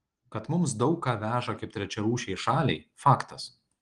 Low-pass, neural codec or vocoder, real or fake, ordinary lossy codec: 9.9 kHz; none; real; Opus, 24 kbps